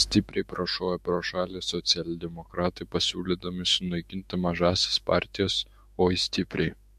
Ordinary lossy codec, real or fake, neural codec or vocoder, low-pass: MP3, 64 kbps; fake; autoencoder, 48 kHz, 128 numbers a frame, DAC-VAE, trained on Japanese speech; 14.4 kHz